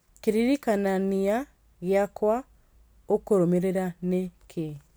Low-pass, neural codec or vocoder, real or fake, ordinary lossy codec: none; none; real; none